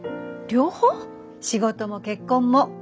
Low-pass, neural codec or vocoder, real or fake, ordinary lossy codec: none; none; real; none